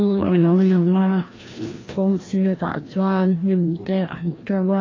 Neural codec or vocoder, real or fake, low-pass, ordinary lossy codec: codec, 16 kHz, 1 kbps, FreqCodec, larger model; fake; 7.2 kHz; AAC, 32 kbps